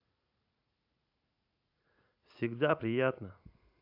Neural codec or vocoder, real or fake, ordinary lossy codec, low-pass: vocoder, 44.1 kHz, 80 mel bands, Vocos; fake; AAC, 48 kbps; 5.4 kHz